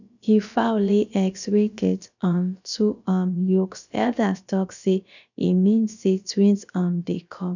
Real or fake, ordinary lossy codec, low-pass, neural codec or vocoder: fake; none; 7.2 kHz; codec, 16 kHz, about 1 kbps, DyCAST, with the encoder's durations